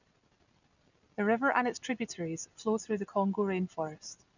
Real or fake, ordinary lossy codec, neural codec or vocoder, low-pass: real; none; none; 7.2 kHz